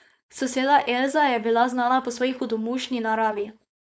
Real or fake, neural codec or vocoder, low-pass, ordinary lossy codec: fake; codec, 16 kHz, 4.8 kbps, FACodec; none; none